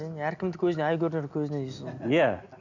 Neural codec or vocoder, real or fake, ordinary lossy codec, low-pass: none; real; none; 7.2 kHz